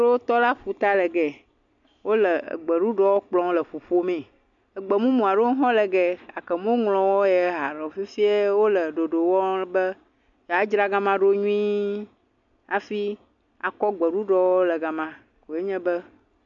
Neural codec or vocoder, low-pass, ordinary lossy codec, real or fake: none; 7.2 kHz; MP3, 64 kbps; real